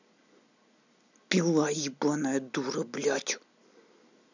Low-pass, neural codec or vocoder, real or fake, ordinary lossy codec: 7.2 kHz; none; real; none